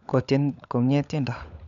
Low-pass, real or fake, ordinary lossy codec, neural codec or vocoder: 7.2 kHz; fake; none; codec, 16 kHz, 16 kbps, FunCodec, trained on LibriTTS, 50 frames a second